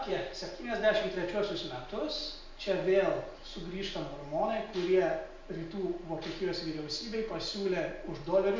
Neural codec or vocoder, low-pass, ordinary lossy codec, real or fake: none; 7.2 kHz; MP3, 64 kbps; real